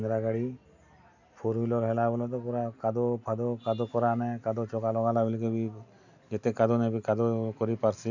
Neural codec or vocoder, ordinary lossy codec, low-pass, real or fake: none; none; 7.2 kHz; real